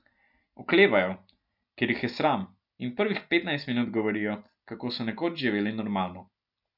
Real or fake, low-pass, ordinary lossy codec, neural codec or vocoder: real; 5.4 kHz; none; none